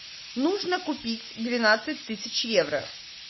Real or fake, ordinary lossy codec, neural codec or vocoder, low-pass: real; MP3, 24 kbps; none; 7.2 kHz